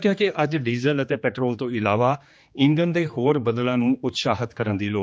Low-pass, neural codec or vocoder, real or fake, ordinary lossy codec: none; codec, 16 kHz, 2 kbps, X-Codec, HuBERT features, trained on general audio; fake; none